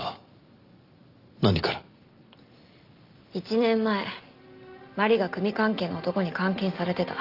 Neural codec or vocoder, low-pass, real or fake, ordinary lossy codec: none; 5.4 kHz; real; Opus, 32 kbps